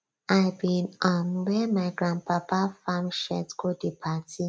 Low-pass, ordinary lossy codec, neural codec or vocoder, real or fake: none; none; none; real